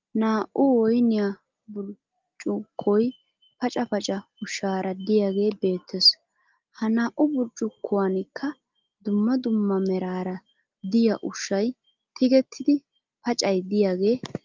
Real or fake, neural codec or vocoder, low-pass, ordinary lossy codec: real; none; 7.2 kHz; Opus, 32 kbps